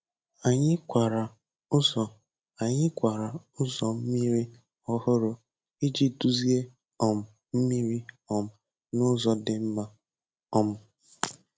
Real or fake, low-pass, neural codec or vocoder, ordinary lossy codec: real; none; none; none